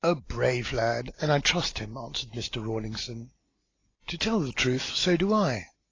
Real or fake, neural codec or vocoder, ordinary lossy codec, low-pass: real; none; AAC, 32 kbps; 7.2 kHz